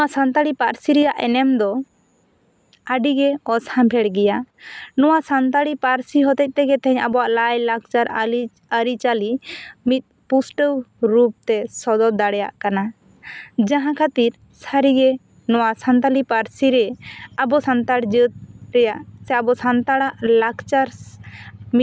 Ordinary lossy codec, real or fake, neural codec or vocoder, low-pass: none; real; none; none